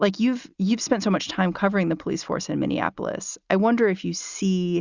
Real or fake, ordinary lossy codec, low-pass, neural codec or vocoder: real; Opus, 64 kbps; 7.2 kHz; none